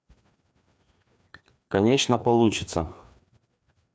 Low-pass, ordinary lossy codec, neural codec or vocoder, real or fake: none; none; codec, 16 kHz, 2 kbps, FreqCodec, larger model; fake